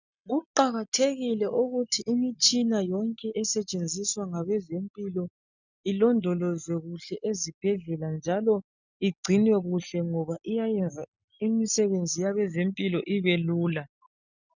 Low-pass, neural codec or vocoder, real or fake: 7.2 kHz; none; real